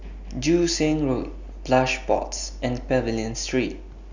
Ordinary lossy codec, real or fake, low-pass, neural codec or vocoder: none; real; 7.2 kHz; none